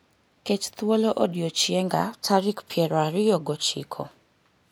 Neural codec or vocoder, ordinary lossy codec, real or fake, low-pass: none; none; real; none